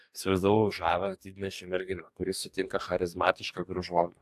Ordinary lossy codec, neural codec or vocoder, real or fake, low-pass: AAC, 96 kbps; codec, 44.1 kHz, 2.6 kbps, DAC; fake; 14.4 kHz